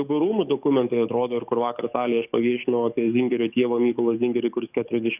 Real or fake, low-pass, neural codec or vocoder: fake; 3.6 kHz; codec, 44.1 kHz, 7.8 kbps, DAC